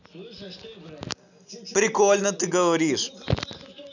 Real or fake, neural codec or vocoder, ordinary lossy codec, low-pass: real; none; none; 7.2 kHz